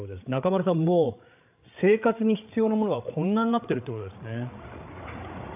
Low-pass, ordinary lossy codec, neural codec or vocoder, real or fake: 3.6 kHz; AAC, 32 kbps; codec, 16 kHz, 8 kbps, FreqCodec, larger model; fake